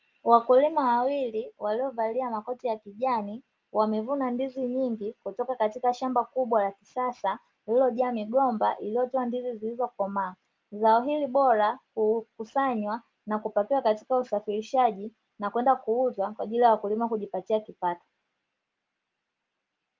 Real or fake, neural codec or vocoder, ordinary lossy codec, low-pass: real; none; Opus, 24 kbps; 7.2 kHz